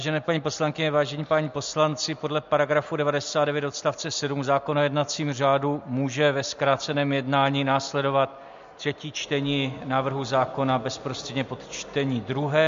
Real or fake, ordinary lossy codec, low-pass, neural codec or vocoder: real; MP3, 48 kbps; 7.2 kHz; none